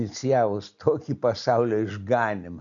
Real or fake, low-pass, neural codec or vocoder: real; 7.2 kHz; none